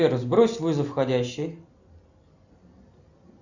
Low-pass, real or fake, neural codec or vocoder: 7.2 kHz; real; none